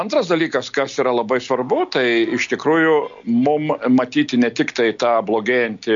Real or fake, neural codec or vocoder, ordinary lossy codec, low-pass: real; none; MP3, 48 kbps; 7.2 kHz